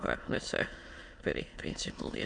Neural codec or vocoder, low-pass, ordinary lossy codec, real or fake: autoencoder, 22.05 kHz, a latent of 192 numbers a frame, VITS, trained on many speakers; 9.9 kHz; MP3, 64 kbps; fake